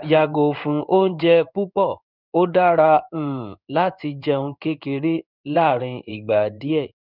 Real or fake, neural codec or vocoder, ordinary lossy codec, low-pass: fake; codec, 16 kHz in and 24 kHz out, 1 kbps, XY-Tokenizer; none; 5.4 kHz